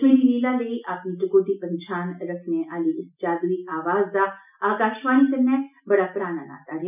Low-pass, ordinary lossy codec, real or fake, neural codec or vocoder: 3.6 kHz; none; real; none